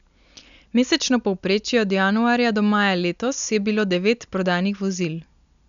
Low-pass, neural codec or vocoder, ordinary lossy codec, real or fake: 7.2 kHz; none; none; real